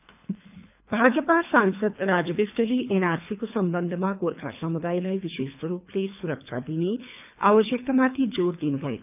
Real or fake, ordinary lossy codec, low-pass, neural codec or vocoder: fake; none; 3.6 kHz; codec, 24 kHz, 3 kbps, HILCodec